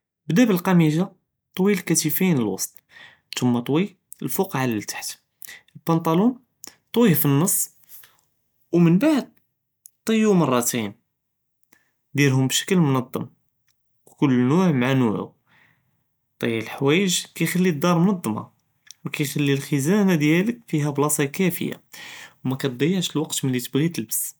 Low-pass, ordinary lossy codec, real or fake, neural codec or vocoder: none; none; real; none